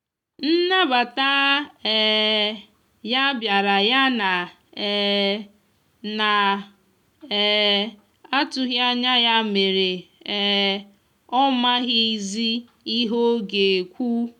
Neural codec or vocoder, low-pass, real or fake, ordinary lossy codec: none; 19.8 kHz; real; none